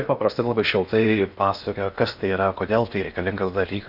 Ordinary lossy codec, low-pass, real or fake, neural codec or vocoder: Opus, 64 kbps; 5.4 kHz; fake; codec, 16 kHz in and 24 kHz out, 0.8 kbps, FocalCodec, streaming, 65536 codes